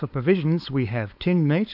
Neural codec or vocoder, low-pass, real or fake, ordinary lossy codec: codec, 16 kHz, 4.8 kbps, FACodec; 5.4 kHz; fake; AAC, 48 kbps